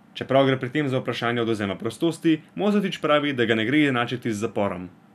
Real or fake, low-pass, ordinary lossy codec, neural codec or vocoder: real; 14.4 kHz; none; none